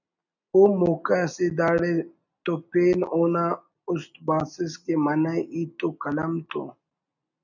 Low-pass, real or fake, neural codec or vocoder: 7.2 kHz; real; none